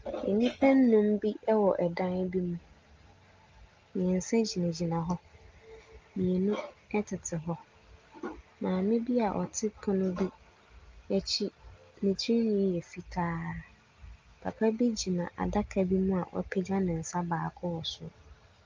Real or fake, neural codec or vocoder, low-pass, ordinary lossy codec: real; none; 7.2 kHz; Opus, 24 kbps